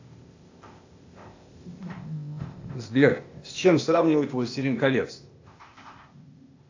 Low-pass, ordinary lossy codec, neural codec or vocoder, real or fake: 7.2 kHz; AAC, 48 kbps; codec, 16 kHz, 0.8 kbps, ZipCodec; fake